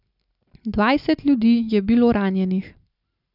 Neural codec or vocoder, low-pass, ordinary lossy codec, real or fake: none; 5.4 kHz; none; real